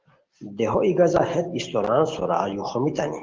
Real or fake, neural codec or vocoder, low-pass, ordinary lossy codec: real; none; 7.2 kHz; Opus, 16 kbps